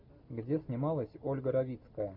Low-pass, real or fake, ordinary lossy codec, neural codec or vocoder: 5.4 kHz; real; MP3, 48 kbps; none